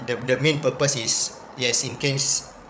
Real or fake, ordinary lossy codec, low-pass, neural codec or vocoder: fake; none; none; codec, 16 kHz, 8 kbps, FreqCodec, larger model